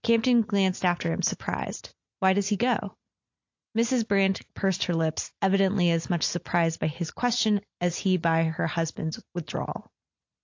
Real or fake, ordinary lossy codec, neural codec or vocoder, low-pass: real; AAC, 48 kbps; none; 7.2 kHz